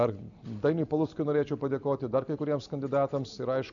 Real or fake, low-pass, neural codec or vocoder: real; 7.2 kHz; none